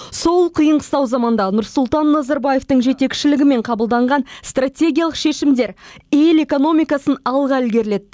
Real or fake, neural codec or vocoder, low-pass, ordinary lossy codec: real; none; none; none